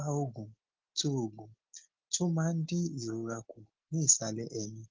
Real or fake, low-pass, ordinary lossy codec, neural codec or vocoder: real; 7.2 kHz; Opus, 16 kbps; none